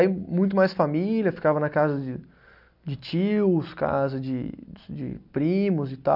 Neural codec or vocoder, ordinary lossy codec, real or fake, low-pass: none; none; real; 5.4 kHz